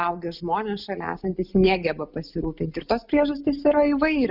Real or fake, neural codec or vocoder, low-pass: real; none; 5.4 kHz